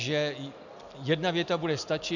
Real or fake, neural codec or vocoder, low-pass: real; none; 7.2 kHz